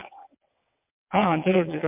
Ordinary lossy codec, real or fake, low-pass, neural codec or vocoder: MP3, 32 kbps; fake; 3.6 kHz; vocoder, 22.05 kHz, 80 mel bands, WaveNeXt